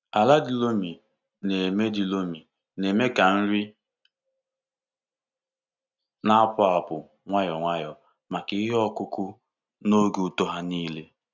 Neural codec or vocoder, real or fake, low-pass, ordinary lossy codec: none; real; 7.2 kHz; none